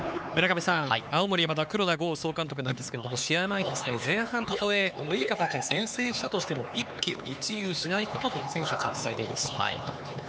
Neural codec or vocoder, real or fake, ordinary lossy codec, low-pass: codec, 16 kHz, 4 kbps, X-Codec, HuBERT features, trained on LibriSpeech; fake; none; none